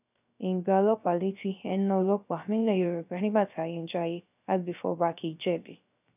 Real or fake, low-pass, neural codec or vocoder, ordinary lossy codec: fake; 3.6 kHz; codec, 16 kHz, 0.3 kbps, FocalCodec; none